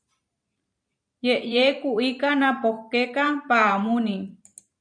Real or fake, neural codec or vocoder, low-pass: fake; vocoder, 44.1 kHz, 128 mel bands every 512 samples, BigVGAN v2; 9.9 kHz